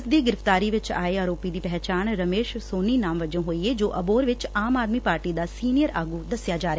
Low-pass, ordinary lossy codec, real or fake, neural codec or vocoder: none; none; real; none